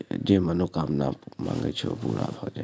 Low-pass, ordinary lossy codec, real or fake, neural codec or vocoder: none; none; real; none